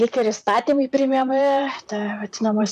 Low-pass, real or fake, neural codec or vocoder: 14.4 kHz; fake; vocoder, 44.1 kHz, 128 mel bands, Pupu-Vocoder